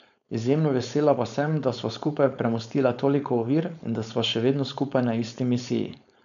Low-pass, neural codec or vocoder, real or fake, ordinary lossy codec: 7.2 kHz; codec, 16 kHz, 4.8 kbps, FACodec; fake; none